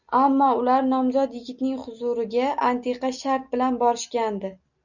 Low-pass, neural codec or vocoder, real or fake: 7.2 kHz; none; real